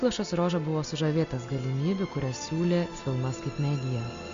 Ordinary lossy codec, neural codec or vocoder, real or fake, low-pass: Opus, 64 kbps; none; real; 7.2 kHz